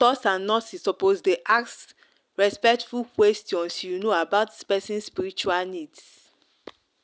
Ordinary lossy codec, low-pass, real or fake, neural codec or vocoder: none; none; real; none